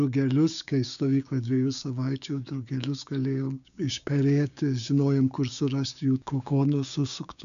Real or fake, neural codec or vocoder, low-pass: fake; codec, 16 kHz, 6 kbps, DAC; 7.2 kHz